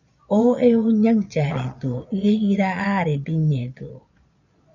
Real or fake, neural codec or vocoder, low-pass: fake; vocoder, 22.05 kHz, 80 mel bands, Vocos; 7.2 kHz